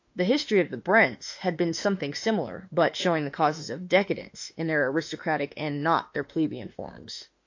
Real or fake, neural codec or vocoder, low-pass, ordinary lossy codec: fake; autoencoder, 48 kHz, 32 numbers a frame, DAC-VAE, trained on Japanese speech; 7.2 kHz; AAC, 48 kbps